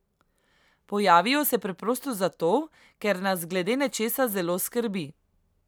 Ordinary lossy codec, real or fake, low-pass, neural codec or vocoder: none; real; none; none